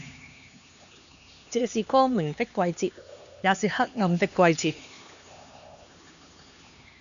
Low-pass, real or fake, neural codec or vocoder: 7.2 kHz; fake; codec, 16 kHz, 2 kbps, X-Codec, HuBERT features, trained on LibriSpeech